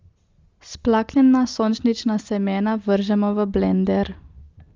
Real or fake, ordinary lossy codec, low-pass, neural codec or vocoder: real; Opus, 32 kbps; 7.2 kHz; none